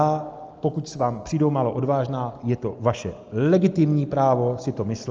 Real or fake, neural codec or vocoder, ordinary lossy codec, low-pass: real; none; Opus, 24 kbps; 7.2 kHz